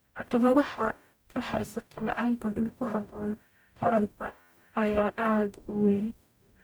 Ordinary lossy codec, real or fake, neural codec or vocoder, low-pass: none; fake; codec, 44.1 kHz, 0.9 kbps, DAC; none